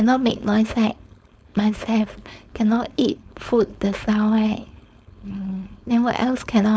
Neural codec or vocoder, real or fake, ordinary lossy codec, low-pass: codec, 16 kHz, 4.8 kbps, FACodec; fake; none; none